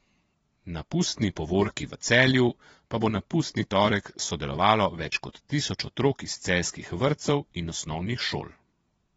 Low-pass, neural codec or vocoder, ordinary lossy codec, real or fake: 9.9 kHz; vocoder, 22.05 kHz, 80 mel bands, Vocos; AAC, 24 kbps; fake